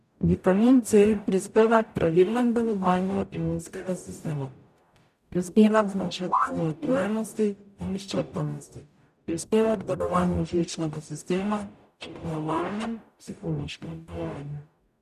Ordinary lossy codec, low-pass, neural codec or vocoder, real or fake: none; 14.4 kHz; codec, 44.1 kHz, 0.9 kbps, DAC; fake